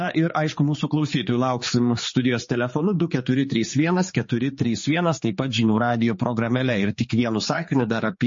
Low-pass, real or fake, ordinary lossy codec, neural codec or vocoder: 7.2 kHz; fake; MP3, 32 kbps; codec, 16 kHz, 4 kbps, X-Codec, HuBERT features, trained on general audio